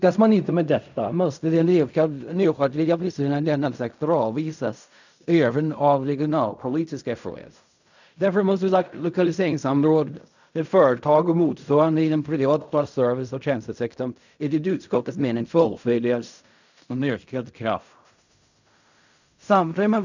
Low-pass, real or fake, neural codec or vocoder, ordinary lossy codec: 7.2 kHz; fake; codec, 16 kHz in and 24 kHz out, 0.4 kbps, LongCat-Audio-Codec, fine tuned four codebook decoder; none